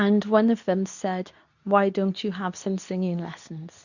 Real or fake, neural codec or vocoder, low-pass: fake; codec, 24 kHz, 0.9 kbps, WavTokenizer, medium speech release version 2; 7.2 kHz